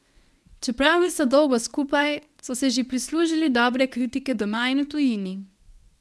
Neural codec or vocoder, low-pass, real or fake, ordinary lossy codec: codec, 24 kHz, 0.9 kbps, WavTokenizer, medium speech release version 1; none; fake; none